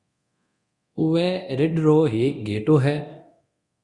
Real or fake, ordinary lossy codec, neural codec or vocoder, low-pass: fake; Opus, 64 kbps; codec, 24 kHz, 0.9 kbps, DualCodec; 10.8 kHz